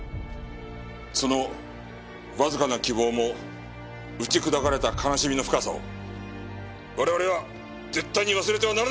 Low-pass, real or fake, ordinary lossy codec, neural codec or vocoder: none; real; none; none